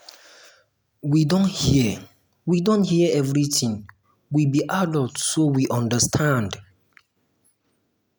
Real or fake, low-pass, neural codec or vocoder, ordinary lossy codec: real; none; none; none